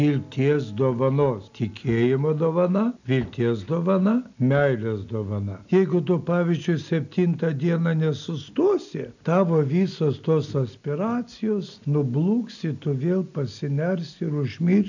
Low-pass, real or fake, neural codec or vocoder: 7.2 kHz; real; none